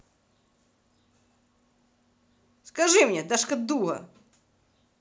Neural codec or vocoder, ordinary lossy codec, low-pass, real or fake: none; none; none; real